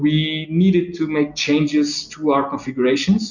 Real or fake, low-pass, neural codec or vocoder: real; 7.2 kHz; none